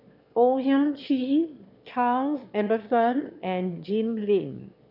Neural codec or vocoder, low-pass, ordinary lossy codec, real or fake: autoencoder, 22.05 kHz, a latent of 192 numbers a frame, VITS, trained on one speaker; 5.4 kHz; none; fake